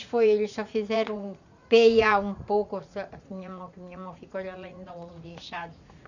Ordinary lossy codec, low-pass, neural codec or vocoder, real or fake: none; 7.2 kHz; vocoder, 44.1 kHz, 80 mel bands, Vocos; fake